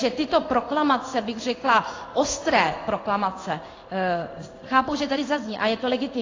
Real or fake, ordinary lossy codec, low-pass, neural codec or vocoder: fake; AAC, 32 kbps; 7.2 kHz; codec, 16 kHz in and 24 kHz out, 1 kbps, XY-Tokenizer